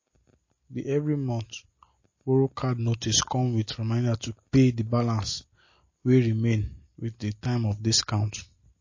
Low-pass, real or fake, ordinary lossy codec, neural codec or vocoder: 7.2 kHz; real; MP3, 32 kbps; none